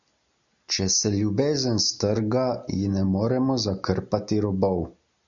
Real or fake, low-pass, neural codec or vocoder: real; 7.2 kHz; none